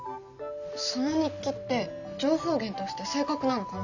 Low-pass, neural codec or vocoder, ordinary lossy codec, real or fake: 7.2 kHz; none; none; real